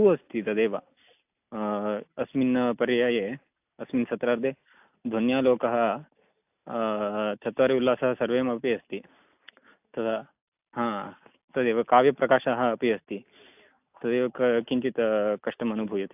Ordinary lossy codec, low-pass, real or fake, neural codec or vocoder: AAC, 32 kbps; 3.6 kHz; real; none